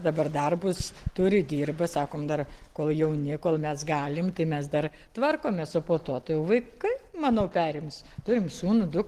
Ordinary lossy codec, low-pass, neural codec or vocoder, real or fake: Opus, 16 kbps; 14.4 kHz; none; real